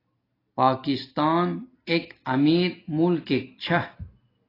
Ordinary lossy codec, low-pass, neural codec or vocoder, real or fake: AAC, 24 kbps; 5.4 kHz; none; real